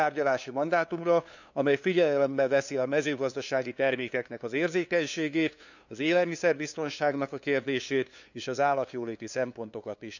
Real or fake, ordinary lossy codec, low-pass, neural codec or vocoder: fake; none; 7.2 kHz; codec, 16 kHz, 2 kbps, FunCodec, trained on LibriTTS, 25 frames a second